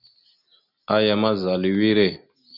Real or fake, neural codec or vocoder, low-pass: real; none; 5.4 kHz